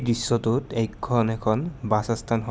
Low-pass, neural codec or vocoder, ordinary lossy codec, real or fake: none; none; none; real